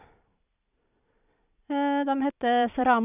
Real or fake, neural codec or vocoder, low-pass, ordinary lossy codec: fake; vocoder, 44.1 kHz, 128 mel bands every 512 samples, BigVGAN v2; 3.6 kHz; none